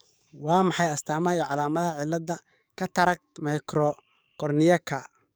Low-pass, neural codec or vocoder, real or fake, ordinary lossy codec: none; codec, 44.1 kHz, 7.8 kbps, DAC; fake; none